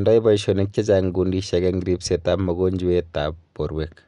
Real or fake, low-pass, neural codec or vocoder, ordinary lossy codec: real; 10.8 kHz; none; none